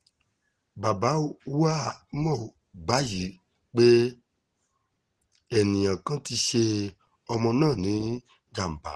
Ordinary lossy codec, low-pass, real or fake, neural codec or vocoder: Opus, 16 kbps; 10.8 kHz; real; none